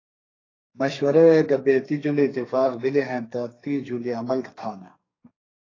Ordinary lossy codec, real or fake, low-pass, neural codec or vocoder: AAC, 32 kbps; fake; 7.2 kHz; codec, 32 kHz, 1.9 kbps, SNAC